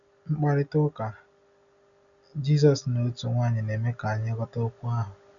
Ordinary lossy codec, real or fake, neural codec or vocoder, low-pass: none; real; none; 7.2 kHz